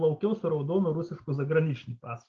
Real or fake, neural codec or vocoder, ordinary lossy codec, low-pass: real; none; Opus, 16 kbps; 7.2 kHz